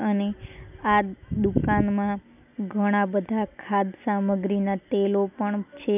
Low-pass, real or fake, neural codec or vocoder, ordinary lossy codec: 3.6 kHz; real; none; none